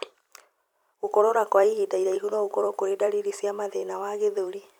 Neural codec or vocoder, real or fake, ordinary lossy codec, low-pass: vocoder, 44.1 kHz, 128 mel bands every 256 samples, BigVGAN v2; fake; none; 19.8 kHz